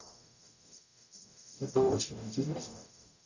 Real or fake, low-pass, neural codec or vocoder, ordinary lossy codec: fake; 7.2 kHz; codec, 44.1 kHz, 0.9 kbps, DAC; none